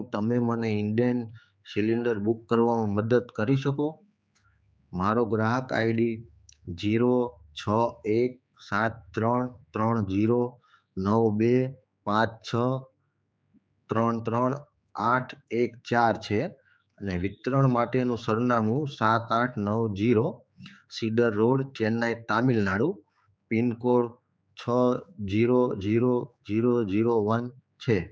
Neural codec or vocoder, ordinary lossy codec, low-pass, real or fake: codec, 16 kHz, 4 kbps, X-Codec, HuBERT features, trained on general audio; none; none; fake